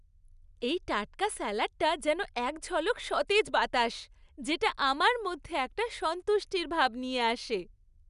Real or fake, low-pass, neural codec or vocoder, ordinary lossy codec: real; 10.8 kHz; none; none